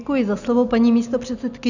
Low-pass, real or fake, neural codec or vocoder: 7.2 kHz; real; none